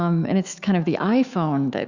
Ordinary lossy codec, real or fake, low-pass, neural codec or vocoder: Opus, 64 kbps; fake; 7.2 kHz; autoencoder, 48 kHz, 128 numbers a frame, DAC-VAE, trained on Japanese speech